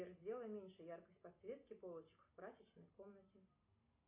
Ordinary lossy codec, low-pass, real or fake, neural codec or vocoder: AAC, 32 kbps; 3.6 kHz; real; none